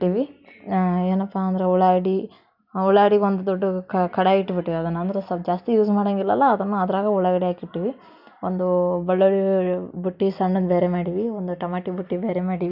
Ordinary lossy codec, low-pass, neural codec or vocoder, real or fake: none; 5.4 kHz; none; real